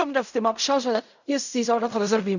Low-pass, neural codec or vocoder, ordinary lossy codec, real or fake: 7.2 kHz; codec, 16 kHz in and 24 kHz out, 0.4 kbps, LongCat-Audio-Codec, fine tuned four codebook decoder; none; fake